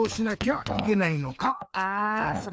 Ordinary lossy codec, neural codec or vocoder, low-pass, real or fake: none; codec, 16 kHz, 2 kbps, FreqCodec, larger model; none; fake